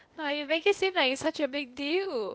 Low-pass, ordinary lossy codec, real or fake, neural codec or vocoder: none; none; fake; codec, 16 kHz, 0.8 kbps, ZipCodec